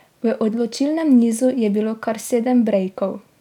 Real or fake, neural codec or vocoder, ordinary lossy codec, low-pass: real; none; none; 19.8 kHz